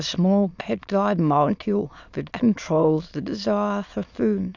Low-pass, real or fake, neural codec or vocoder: 7.2 kHz; fake; autoencoder, 22.05 kHz, a latent of 192 numbers a frame, VITS, trained on many speakers